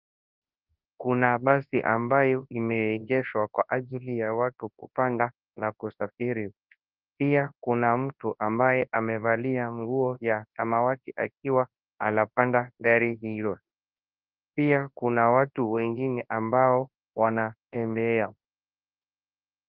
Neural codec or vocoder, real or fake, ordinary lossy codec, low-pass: codec, 24 kHz, 0.9 kbps, WavTokenizer, large speech release; fake; Opus, 24 kbps; 5.4 kHz